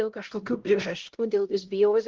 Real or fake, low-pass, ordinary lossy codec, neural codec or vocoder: fake; 7.2 kHz; Opus, 16 kbps; codec, 16 kHz, 0.5 kbps, X-Codec, HuBERT features, trained on LibriSpeech